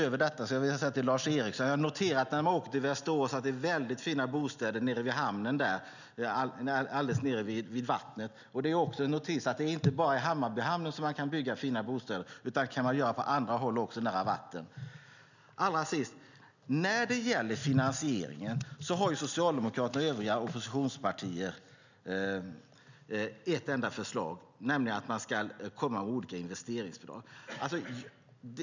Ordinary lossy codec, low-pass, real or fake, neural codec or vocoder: none; 7.2 kHz; real; none